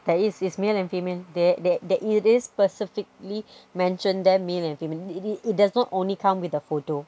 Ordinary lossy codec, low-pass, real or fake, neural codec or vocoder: none; none; real; none